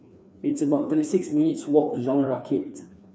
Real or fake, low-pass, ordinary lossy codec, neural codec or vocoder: fake; none; none; codec, 16 kHz, 2 kbps, FreqCodec, larger model